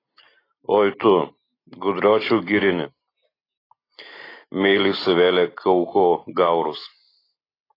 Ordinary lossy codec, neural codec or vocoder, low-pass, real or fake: AAC, 24 kbps; none; 5.4 kHz; real